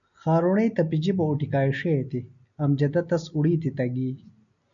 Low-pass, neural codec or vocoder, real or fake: 7.2 kHz; none; real